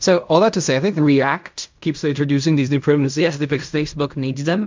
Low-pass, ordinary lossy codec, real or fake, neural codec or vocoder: 7.2 kHz; MP3, 64 kbps; fake; codec, 16 kHz in and 24 kHz out, 0.4 kbps, LongCat-Audio-Codec, fine tuned four codebook decoder